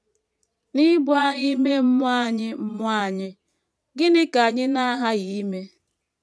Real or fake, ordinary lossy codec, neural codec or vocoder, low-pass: fake; none; vocoder, 22.05 kHz, 80 mel bands, Vocos; none